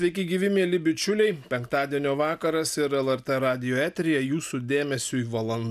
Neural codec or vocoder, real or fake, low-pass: none; real; 14.4 kHz